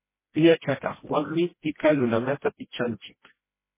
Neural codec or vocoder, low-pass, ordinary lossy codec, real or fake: codec, 16 kHz, 1 kbps, FreqCodec, smaller model; 3.6 kHz; MP3, 16 kbps; fake